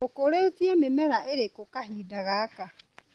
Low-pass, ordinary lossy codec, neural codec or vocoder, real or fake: 10.8 kHz; Opus, 16 kbps; none; real